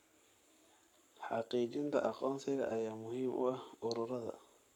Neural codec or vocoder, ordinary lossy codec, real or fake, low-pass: codec, 44.1 kHz, 7.8 kbps, Pupu-Codec; none; fake; 19.8 kHz